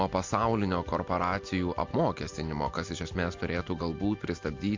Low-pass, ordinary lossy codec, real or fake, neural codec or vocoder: 7.2 kHz; MP3, 48 kbps; real; none